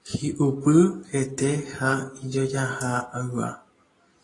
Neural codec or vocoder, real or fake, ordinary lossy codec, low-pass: none; real; AAC, 32 kbps; 10.8 kHz